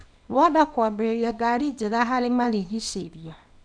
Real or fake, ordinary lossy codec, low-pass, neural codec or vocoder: fake; none; 9.9 kHz; codec, 24 kHz, 0.9 kbps, WavTokenizer, small release